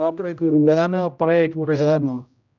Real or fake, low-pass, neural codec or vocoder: fake; 7.2 kHz; codec, 16 kHz, 0.5 kbps, X-Codec, HuBERT features, trained on general audio